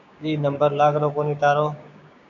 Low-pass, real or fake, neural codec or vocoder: 7.2 kHz; fake; codec, 16 kHz, 6 kbps, DAC